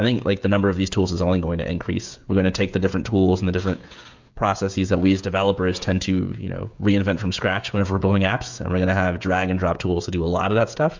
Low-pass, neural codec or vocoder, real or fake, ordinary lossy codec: 7.2 kHz; codec, 16 kHz, 16 kbps, FreqCodec, smaller model; fake; MP3, 64 kbps